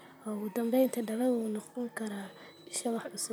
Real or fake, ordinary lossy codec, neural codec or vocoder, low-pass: fake; none; vocoder, 44.1 kHz, 128 mel bands, Pupu-Vocoder; none